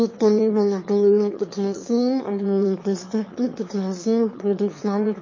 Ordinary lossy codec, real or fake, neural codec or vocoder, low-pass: MP3, 32 kbps; fake; autoencoder, 22.05 kHz, a latent of 192 numbers a frame, VITS, trained on one speaker; 7.2 kHz